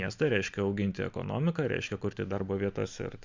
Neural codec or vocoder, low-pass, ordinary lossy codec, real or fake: none; 7.2 kHz; MP3, 64 kbps; real